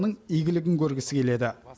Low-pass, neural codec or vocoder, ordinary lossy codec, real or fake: none; none; none; real